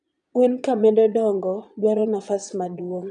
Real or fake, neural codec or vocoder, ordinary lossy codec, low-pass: fake; vocoder, 22.05 kHz, 80 mel bands, Vocos; none; 9.9 kHz